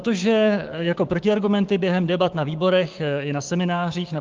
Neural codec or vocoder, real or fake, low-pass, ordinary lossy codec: codec, 16 kHz, 6 kbps, DAC; fake; 7.2 kHz; Opus, 24 kbps